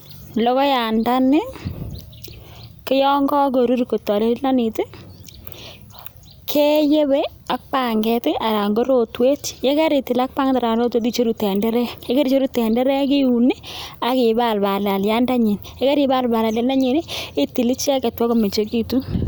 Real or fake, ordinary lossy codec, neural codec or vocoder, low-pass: real; none; none; none